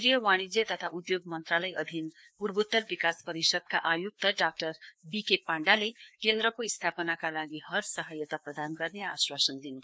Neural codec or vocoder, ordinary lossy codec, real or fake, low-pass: codec, 16 kHz, 2 kbps, FreqCodec, larger model; none; fake; none